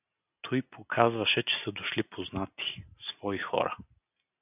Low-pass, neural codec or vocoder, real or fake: 3.6 kHz; none; real